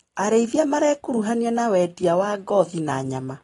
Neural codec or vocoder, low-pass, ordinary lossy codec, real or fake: none; 10.8 kHz; AAC, 32 kbps; real